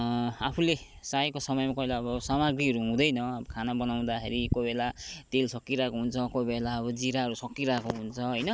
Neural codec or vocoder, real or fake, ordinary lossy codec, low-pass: none; real; none; none